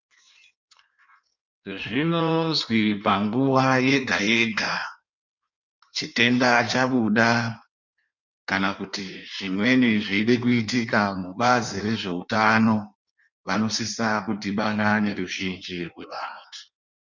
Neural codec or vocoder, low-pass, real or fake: codec, 16 kHz in and 24 kHz out, 1.1 kbps, FireRedTTS-2 codec; 7.2 kHz; fake